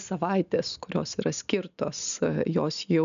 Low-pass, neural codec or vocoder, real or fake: 7.2 kHz; none; real